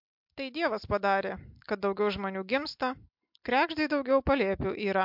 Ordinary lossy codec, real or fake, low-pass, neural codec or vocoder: MP3, 48 kbps; real; 5.4 kHz; none